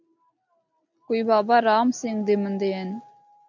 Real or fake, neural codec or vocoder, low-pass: real; none; 7.2 kHz